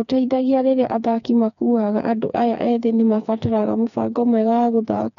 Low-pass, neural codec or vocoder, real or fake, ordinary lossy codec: 7.2 kHz; codec, 16 kHz, 4 kbps, FreqCodec, smaller model; fake; none